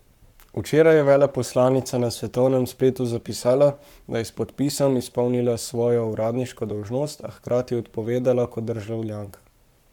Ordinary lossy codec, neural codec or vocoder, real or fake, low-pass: none; codec, 44.1 kHz, 7.8 kbps, Pupu-Codec; fake; 19.8 kHz